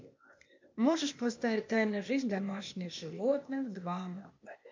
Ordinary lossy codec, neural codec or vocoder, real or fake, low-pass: MP3, 64 kbps; codec, 16 kHz, 0.8 kbps, ZipCodec; fake; 7.2 kHz